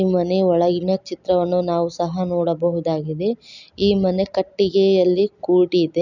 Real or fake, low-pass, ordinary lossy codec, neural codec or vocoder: real; 7.2 kHz; none; none